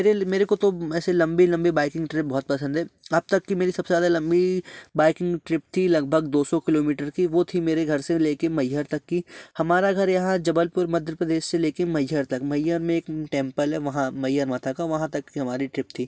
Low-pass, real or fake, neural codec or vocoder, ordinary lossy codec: none; real; none; none